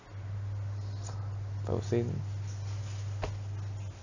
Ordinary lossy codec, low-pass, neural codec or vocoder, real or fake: none; 7.2 kHz; none; real